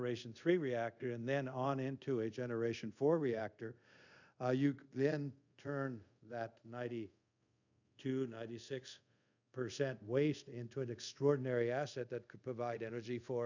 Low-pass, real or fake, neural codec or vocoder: 7.2 kHz; fake; codec, 24 kHz, 0.5 kbps, DualCodec